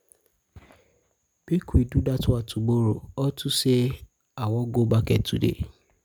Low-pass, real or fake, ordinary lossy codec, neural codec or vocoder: 19.8 kHz; real; none; none